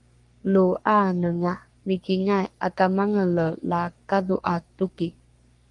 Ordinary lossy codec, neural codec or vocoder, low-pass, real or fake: Opus, 32 kbps; codec, 44.1 kHz, 3.4 kbps, Pupu-Codec; 10.8 kHz; fake